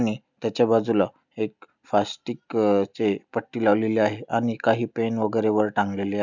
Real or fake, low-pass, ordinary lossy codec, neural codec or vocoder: real; 7.2 kHz; none; none